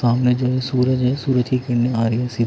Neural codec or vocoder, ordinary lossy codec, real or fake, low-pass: none; none; real; none